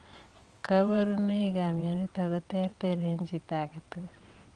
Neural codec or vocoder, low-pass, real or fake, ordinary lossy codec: vocoder, 22.05 kHz, 80 mel bands, WaveNeXt; 9.9 kHz; fake; Opus, 32 kbps